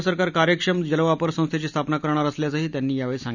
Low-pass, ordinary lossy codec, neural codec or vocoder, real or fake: 7.2 kHz; none; none; real